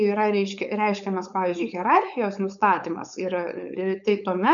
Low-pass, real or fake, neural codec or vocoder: 7.2 kHz; fake; codec, 16 kHz, 4.8 kbps, FACodec